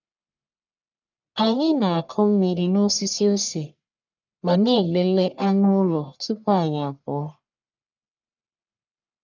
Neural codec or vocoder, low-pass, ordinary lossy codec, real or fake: codec, 44.1 kHz, 1.7 kbps, Pupu-Codec; 7.2 kHz; none; fake